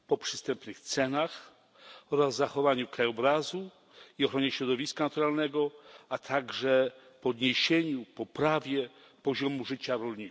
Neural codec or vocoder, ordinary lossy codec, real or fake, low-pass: none; none; real; none